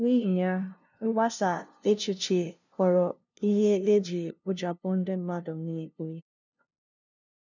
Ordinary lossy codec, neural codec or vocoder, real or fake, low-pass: none; codec, 16 kHz, 0.5 kbps, FunCodec, trained on LibriTTS, 25 frames a second; fake; 7.2 kHz